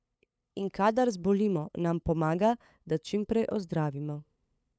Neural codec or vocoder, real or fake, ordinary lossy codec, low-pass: codec, 16 kHz, 8 kbps, FunCodec, trained on LibriTTS, 25 frames a second; fake; none; none